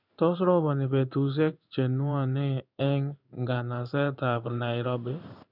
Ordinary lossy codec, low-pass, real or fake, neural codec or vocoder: none; 5.4 kHz; fake; codec, 16 kHz in and 24 kHz out, 1 kbps, XY-Tokenizer